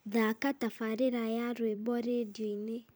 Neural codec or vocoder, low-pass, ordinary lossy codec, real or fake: vocoder, 44.1 kHz, 128 mel bands every 256 samples, BigVGAN v2; none; none; fake